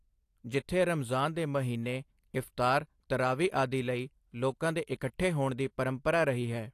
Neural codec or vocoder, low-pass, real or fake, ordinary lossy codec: none; 14.4 kHz; real; AAC, 64 kbps